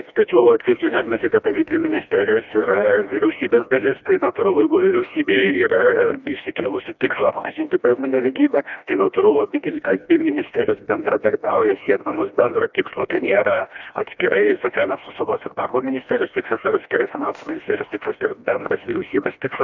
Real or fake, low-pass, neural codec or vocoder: fake; 7.2 kHz; codec, 16 kHz, 1 kbps, FreqCodec, smaller model